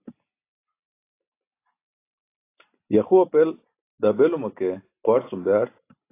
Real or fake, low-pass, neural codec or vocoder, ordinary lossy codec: real; 3.6 kHz; none; AAC, 24 kbps